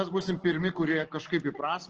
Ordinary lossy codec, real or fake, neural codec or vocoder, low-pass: Opus, 16 kbps; real; none; 7.2 kHz